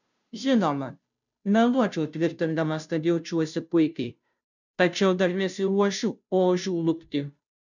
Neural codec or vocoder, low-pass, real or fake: codec, 16 kHz, 0.5 kbps, FunCodec, trained on Chinese and English, 25 frames a second; 7.2 kHz; fake